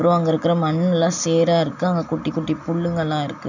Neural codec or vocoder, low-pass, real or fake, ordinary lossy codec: none; 7.2 kHz; real; none